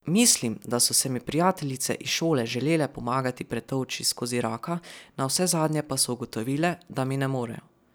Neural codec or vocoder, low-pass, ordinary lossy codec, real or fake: none; none; none; real